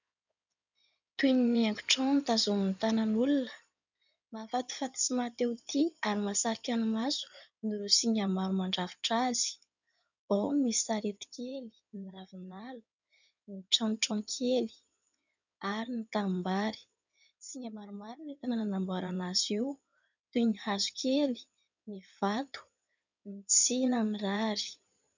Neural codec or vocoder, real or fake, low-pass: codec, 16 kHz in and 24 kHz out, 2.2 kbps, FireRedTTS-2 codec; fake; 7.2 kHz